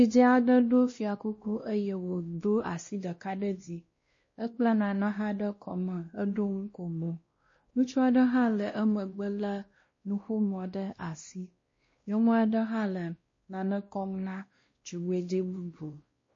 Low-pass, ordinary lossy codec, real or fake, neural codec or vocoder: 7.2 kHz; MP3, 32 kbps; fake; codec, 16 kHz, 1 kbps, X-Codec, WavLM features, trained on Multilingual LibriSpeech